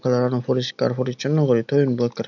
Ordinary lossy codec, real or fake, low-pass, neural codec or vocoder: none; real; 7.2 kHz; none